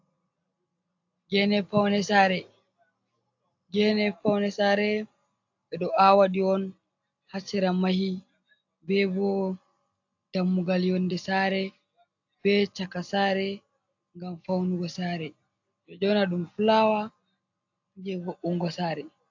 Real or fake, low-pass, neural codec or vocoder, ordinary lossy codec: real; 7.2 kHz; none; AAC, 48 kbps